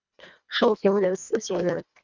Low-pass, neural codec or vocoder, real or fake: 7.2 kHz; codec, 24 kHz, 1.5 kbps, HILCodec; fake